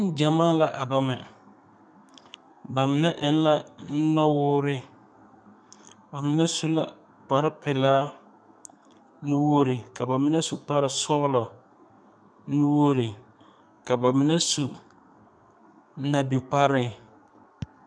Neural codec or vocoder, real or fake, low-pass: codec, 32 kHz, 1.9 kbps, SNAC; fake; 9.9 kHz